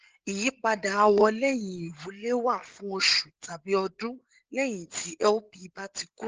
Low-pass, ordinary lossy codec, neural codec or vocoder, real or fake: 7.2 kHz; Opus, 16 kbps; codec, 16 kHz, 8 kbps, FreqCodec, larger model; fake